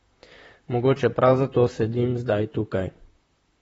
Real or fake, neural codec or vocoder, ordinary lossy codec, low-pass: fake; vocoder, 44.1 kHz, 128 mel bands, Pupu-Vocoder; AAC, 24 kbps; 19.8 kHz